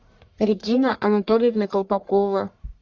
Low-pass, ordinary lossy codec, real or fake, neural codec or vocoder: 7.2 kHz; Opus, 64 kbps; fake; codec, 44.1 kHz, 1.7 kbps, Pupu-Codec